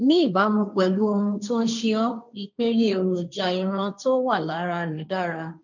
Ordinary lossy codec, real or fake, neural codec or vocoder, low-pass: none; fake; codec, 16 kHz, 1.1 kbps, Voila-Tokenizer; 7.2 kHz